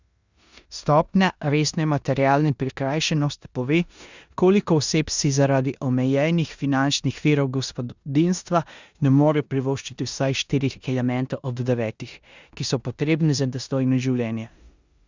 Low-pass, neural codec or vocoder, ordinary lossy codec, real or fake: 7.2 kHz; codec, 16 kHz in and 24 kHz out, 0.9 kbps, LongCat-Audio-Codec, four codebook decoder; Opus, 64 kbps; fake